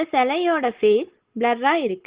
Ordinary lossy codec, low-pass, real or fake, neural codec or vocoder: Opus, 16 kbps; 3.6 kHz; real; none